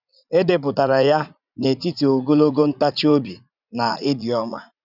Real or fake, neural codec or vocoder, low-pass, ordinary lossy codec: fake; vocoder, 44.1 kHz, 80 mel bands, Vocos; 5.4 kHz; none